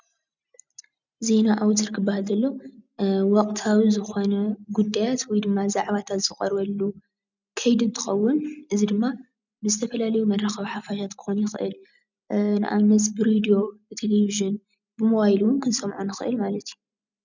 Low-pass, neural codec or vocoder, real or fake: 7.2 kHz; none; real